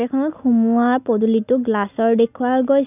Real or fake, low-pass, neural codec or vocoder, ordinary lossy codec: real; 3.6 kHz; none; none